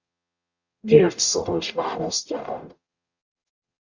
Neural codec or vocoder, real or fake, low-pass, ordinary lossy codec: codec, 44.1 kHz, 0.9 kbps, DAC; fake; 7.2 kHz; Opus, 64 kbps